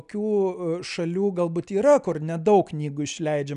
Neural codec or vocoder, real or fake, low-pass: none; real; 10.8 kHz